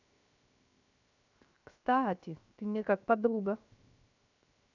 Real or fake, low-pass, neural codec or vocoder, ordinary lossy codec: fake; 7.2 kHz; codec, 16 kHz, 0.7 kbps, FocalCodec; none